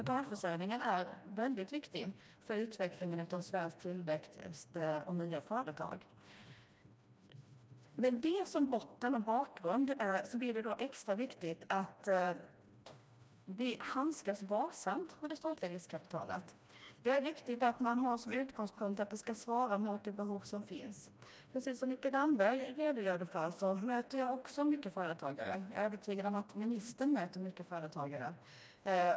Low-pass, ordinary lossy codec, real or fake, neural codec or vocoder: none; none; fake; codec, 16 kHz, 1 kbps, FreqCodec, smaller model